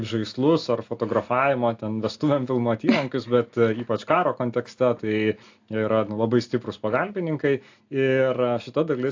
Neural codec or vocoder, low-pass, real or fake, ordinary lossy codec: none; 7.2 kHz; real; AAC, 48 kbps